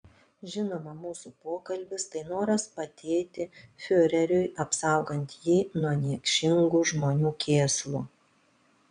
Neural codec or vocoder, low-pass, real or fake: vocoder, 22.05 kHz, 80 mel bands, Vocos; 9.9 kHz; fake